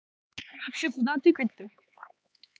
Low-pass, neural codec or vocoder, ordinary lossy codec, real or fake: none; codec, 16 kHz, 4 kbps, X-Codec, HuBERT features, trained on LibriSpeech; none; fake